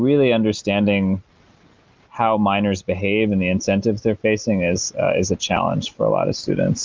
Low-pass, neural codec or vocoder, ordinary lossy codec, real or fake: 7.2 kHz; none; Opus, 32 kbps; real